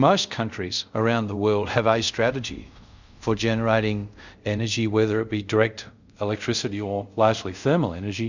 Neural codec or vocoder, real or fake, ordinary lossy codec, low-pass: codec, 24 kHz, 0.5 kbps, DualCodec; fake; Opus, 64 kbps; 7.2 kHz